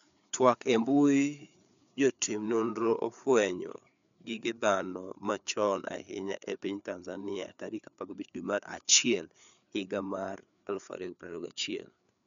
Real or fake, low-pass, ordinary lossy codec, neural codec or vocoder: fake; 7.2 kHz; none; codec, 16 kHz, 4 kbps, FreqCodec, larger model